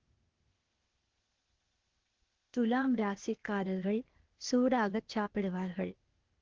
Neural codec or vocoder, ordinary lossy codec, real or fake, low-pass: codec, 16 kHz, 0.8 kbps, ZipCodec; Opus, 16 kbps; fake; 7.2 kHz